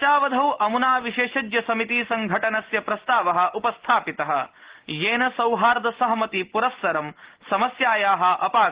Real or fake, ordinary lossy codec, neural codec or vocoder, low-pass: real; Opus, 16 kbps; none; 3.6 kHz